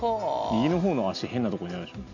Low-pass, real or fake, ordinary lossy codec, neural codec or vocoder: 7.2 kHz; real; none; none